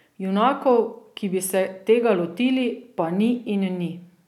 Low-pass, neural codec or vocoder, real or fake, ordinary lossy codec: 19.8 kHz; none; real; none